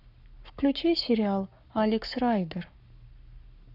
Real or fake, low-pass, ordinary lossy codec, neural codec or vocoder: fake; 5.4 kHz; none; codec, 44.1 kHz, 7.8 kbps, Pupu-Codec